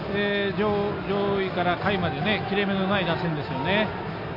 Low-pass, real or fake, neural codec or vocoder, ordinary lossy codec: 5.4 kHz; real; none; none